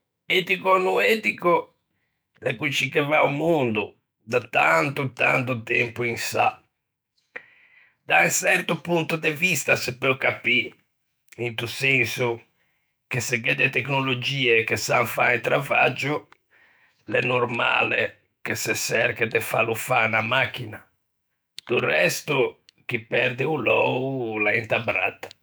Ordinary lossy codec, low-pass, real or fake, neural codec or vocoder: none; none; fake; autoencoder, 48 kHz, 128 numbers a frame, DAC-VAE, trained on Japanese speech